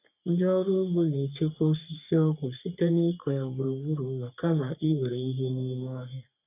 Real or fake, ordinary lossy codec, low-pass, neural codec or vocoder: fake; none; 3.6 kHz; codec, 44.1 kHz, 3.4 kbps, Pupu-Codec